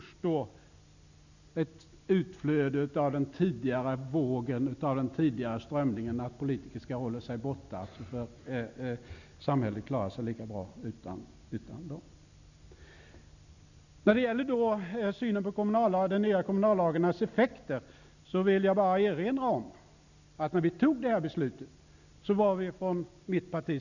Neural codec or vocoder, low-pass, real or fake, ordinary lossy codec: none; 7.2 kHz; real; none